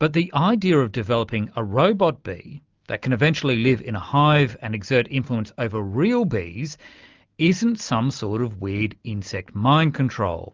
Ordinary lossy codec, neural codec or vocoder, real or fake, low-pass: Opus, 24 kbps; none; real; 7.2 kHz